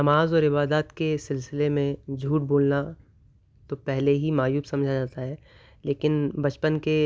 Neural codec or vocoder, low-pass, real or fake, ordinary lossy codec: none; none; real; none